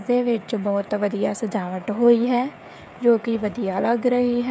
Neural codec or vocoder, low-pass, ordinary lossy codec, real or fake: codec, 16 kHz, 16 kbps, FreqCodec, smaller model; none; none; fake